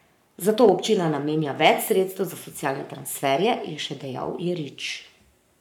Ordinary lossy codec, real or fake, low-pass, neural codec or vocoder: none; fake; 19.8 kHz; codec, 44.1 kHz, 7.8 kbps, Pupu-Codec